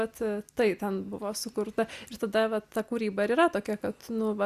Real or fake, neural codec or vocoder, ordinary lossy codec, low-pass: real; none; Opus, 64 kbps; 14.4 kHz